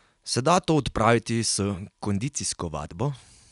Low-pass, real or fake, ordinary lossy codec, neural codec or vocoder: 10.8 kHz; real; none; none